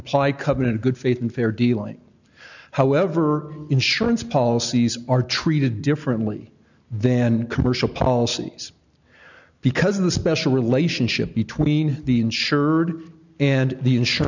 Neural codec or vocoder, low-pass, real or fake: none; 7.2 kHz; real